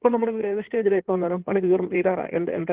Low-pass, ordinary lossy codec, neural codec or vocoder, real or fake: 3.6 kHz; Opus, 16 kbps; codec, 16 kHz in and 24 kHz out, 2.2 kbps, FireRedTTS-2 codec; fake